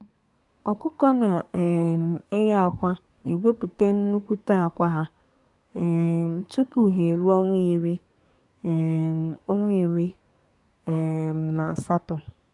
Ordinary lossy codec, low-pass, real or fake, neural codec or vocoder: none; 10.8 kHz; fake; codec, 24 kHz, 1 kbps, SNAC